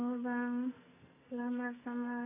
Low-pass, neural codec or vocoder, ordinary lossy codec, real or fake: 3.6 kHz; codec, 44.1 kHz, 2.6 kbps, SNAC; none; fake